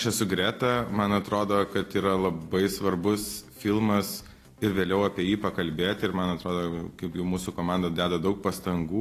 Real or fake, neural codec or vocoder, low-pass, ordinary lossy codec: real; none; 14.4 kHz; AAC, 48 kbps